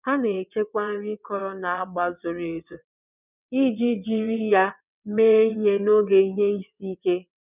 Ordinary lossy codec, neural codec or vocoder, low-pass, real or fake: none; vocoder, 22.05 kHz, 80 mel bands, WaveNeXt; 3.6 kHz; fake